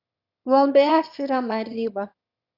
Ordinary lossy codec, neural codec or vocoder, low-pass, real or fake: Opus, 64 kbps; autoencoder, 22.05 kHz, a latent of 192 numbers a frame, VITS, trained on one speaker; 5.4 kHz; fake